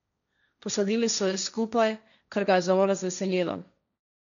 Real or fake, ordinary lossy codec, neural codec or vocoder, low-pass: fake; none; codec, 16 kHz, 1.1 kbps, Voila-Tokenizer; 7.2 kHz